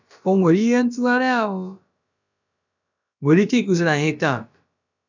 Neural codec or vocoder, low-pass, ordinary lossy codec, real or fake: codec, 16 kHz, about 1 kbps, DyCAST, with the encoder's durations; 7.2 kHz; none; fake